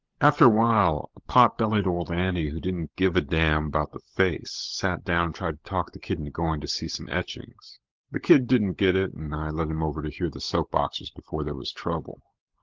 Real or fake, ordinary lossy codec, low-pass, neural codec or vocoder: fake; Opus, 16 kbps; 7.2 kHz; codec, 16 kHz, 16 kbps, FunCodec, trained on LibriTTS, 50 frames a second